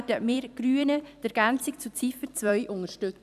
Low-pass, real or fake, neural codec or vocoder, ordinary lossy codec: 14.4 kHz; fake; vocoder, 44.1 kHz, 128 mel bands every 256 samples, BigVGAN v2; none